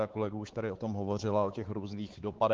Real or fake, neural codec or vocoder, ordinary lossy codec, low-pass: fake; codec, 16 kHz, 4 kbps, X-Codec, WavLM features, trained on Multilingual LibriSpeech; Opus, 16 kbps; 7.2 kHz